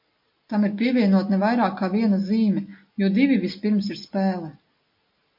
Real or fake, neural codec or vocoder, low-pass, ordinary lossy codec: real; none; 5.4 kHz; MP3, 32 kbps